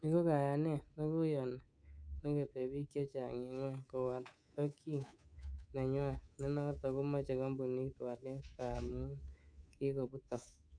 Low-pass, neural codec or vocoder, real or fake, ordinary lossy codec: 9.9 kHz; codec, 24 kHz, 3.1 kbps, DualCodec; fake; none